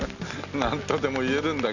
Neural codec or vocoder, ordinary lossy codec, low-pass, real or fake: none; none; 7.2 kHz; real